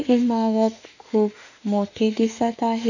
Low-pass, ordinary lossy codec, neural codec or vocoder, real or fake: 7.2 kHz; none; autoencoder, 48 kHz, 32 numbers a frame, DAC-VAE, trained on Japanese speech; fake